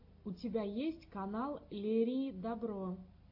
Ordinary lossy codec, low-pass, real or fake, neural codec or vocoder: AAC, 48 kbps; 5.4 kHz; real; none